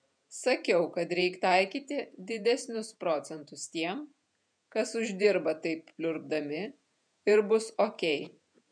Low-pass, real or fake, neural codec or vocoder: 9.9 kHz; fake; vocoder, 48 kHz, 128 mel bands, Vocos